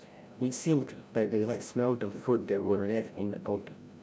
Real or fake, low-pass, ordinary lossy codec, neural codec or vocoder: fake; none; none; codec, 16 kHz, 0.5 kbps, FreqCodec, larger model